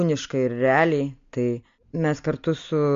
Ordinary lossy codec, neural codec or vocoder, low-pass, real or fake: MP3, 48 kbps; none; 7.2 kHz; real